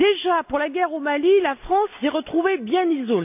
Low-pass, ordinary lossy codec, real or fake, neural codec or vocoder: 3.6 kHz; AAC, 32 kbps; real; none